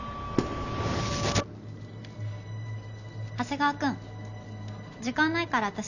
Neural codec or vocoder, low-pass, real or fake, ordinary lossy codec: none; 7.2 kHz; real; none